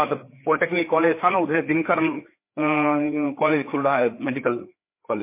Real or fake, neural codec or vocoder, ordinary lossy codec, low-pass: fake; codec, 16 kHz, 4 kbps, FreqCodec, larger model; MP3, 24 kbps; 3.6 kHz